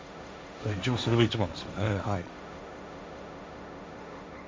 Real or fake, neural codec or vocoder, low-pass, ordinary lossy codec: fake; codec, 16 kHz, 1.1 kbps, Voila-Tokenizer; none; none